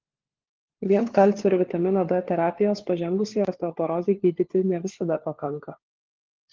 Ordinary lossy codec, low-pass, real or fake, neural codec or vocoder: Opus, 16 kbps; 7.2 kHz; fake; codec, 16 kHz, 4 kbps, FunCodec, trained on LibriTTS, 50 frames a second